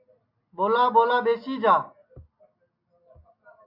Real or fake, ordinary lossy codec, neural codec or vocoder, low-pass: real; MP3, 32 kbps; none; 5.4 kHz